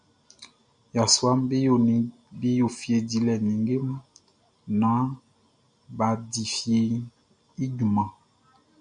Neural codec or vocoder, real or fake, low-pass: none; real; 9.9 kHz